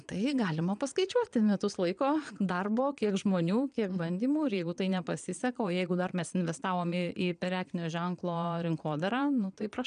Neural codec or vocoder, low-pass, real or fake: vocoder, 22.05 kHz, 80 mel bands, Vocos; 9.9 kHz; fake